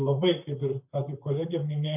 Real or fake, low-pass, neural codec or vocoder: fake; 3.6 kHz; vocoder, 44.1 kHz, 128 mel bands, Pupu-Vocoder